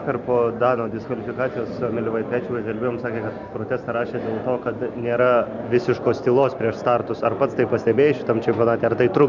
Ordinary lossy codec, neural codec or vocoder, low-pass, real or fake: Opus, 64 kbps; none; 7.2 kHz; real